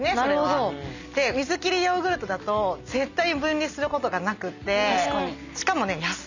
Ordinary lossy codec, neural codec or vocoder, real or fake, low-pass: none; none; real; 7.2 kHz